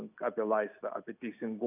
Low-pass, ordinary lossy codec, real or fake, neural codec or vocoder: 3.6 kHz; AAC, 32 kbps; real; none